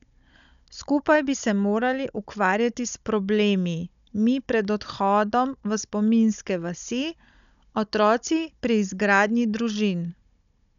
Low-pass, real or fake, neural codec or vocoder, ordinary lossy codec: 7.2 kHz; fake; codec, 16 kHz, 16 kbps, FunCodec, trained on Chinese and English, 50 frames a second; none